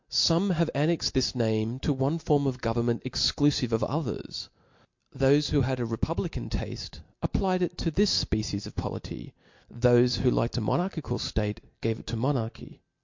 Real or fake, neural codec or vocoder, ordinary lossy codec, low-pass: real; none; MP3, 48 kbps; 7.2 kHz